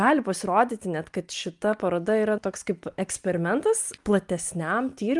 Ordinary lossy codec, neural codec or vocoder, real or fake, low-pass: Opus, 32 kbps; none; real; 10.8 kHz